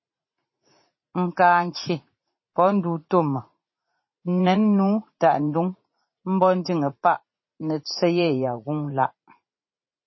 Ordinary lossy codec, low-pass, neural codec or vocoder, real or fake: MP3, 24 kbps; 7.2 kHz; vocoder, 44.1 kHz, 80 mel bands, Vocos; fake